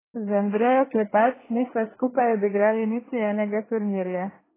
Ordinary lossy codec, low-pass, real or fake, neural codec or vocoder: AAC, 16 kbps; 3.6 kHz; fake; codec, 24 kHz, 1 kbps, SNAC